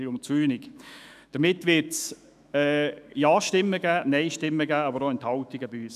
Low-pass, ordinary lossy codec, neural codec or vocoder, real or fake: 14.4 kHz; none; autoencoder, 48 kHz, 128 numbers a frame, DAC-VAE, trained on Japanese speech; fake